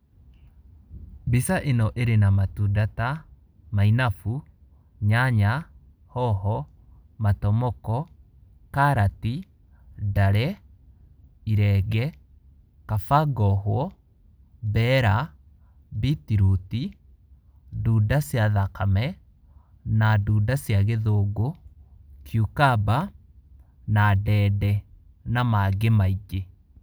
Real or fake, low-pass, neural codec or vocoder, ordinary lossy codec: real; none; none; none